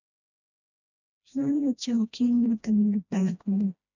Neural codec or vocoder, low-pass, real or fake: codec, 16 kHz, 1 kbps, FreqCodec, smaller model; 7.2 kHz; fake